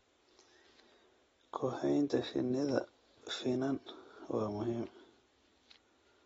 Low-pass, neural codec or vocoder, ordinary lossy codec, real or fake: 19.8 kHz; none; AAC, 24 kbps; real